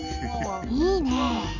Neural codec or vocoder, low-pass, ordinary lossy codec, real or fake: none; 7.2 kHz; none; real